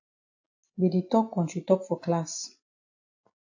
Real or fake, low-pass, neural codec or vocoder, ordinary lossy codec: real; 7.2 kHz; none; AAC, 48 kbps